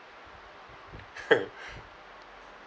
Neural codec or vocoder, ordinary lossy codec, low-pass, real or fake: none; none; none; real